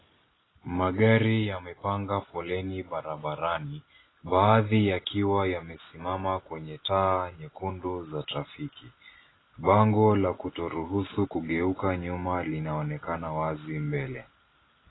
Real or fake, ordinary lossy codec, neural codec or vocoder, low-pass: real; AAC, 16 kbps; none; 7.2 kHz